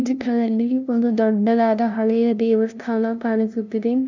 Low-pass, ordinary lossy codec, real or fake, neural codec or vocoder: 7.2 kHz; none; fake; codec, 16 kHz, 0.5 kbps, FunCodec, trained on LibriTTS, 25 frames a second